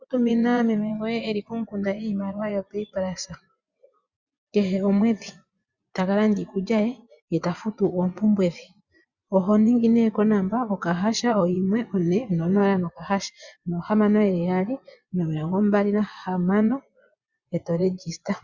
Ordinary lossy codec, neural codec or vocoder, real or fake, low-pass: Opus, 64 kbps; vocoder, 24 kHz, 100 mel bands, Vocos; fake; 7.2 kHz